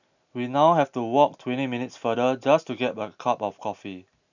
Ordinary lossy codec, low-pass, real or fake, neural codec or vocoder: none; 7.2 kHz; real; none